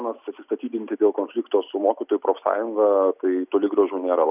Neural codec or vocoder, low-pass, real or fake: none; 3.6 kHz; real